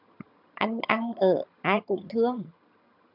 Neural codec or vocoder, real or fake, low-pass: vocoder, 22.05 kHz, 80 mel bands, HiFi-GAN; fake; 5.4 kHz